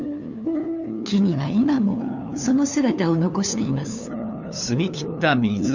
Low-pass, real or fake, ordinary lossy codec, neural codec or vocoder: 7.2 kHz; fake; none; codec, 16 kHz, 2 kbps, FunCodec, trained on LibriTTS, 25 frames a second